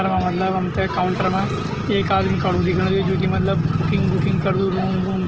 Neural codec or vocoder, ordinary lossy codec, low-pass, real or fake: none; Opus, 16 kbps; 7.2 kHz; real